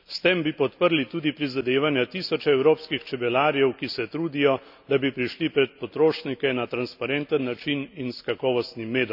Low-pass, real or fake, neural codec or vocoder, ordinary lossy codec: 5.4 kHz; real; none; none